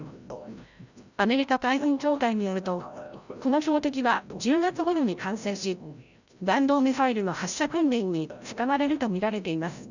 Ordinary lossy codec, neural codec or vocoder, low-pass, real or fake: none; codec, 16 kHz, 0.5 kbps, FreqCodec, larger model; 7.2 kHz; fake